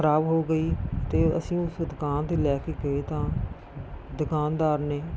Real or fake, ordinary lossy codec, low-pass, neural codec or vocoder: real; none; none; none